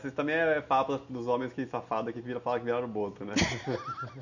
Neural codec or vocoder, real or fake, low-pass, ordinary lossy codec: none; real; 7.2 kHz; none